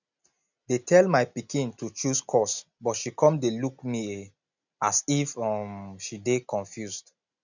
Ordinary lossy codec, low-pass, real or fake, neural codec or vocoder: none; 7.2 kHz; real; none